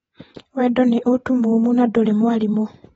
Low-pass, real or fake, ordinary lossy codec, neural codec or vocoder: 19.8 kHz; fake; AAC, 24 kbps; vocoder, 48 kHz, 128 mel bands, Vocos